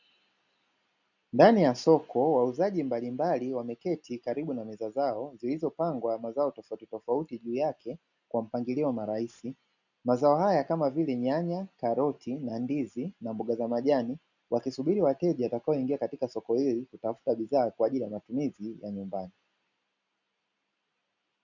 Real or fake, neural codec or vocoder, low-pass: real; none; 7.2 kHz